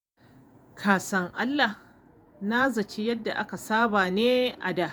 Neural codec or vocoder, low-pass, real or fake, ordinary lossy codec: vocoder, 48 kHz, 128 mel bands, Vocos; none; fake; none